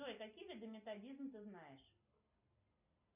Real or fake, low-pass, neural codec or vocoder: real; 3.6 kHz; none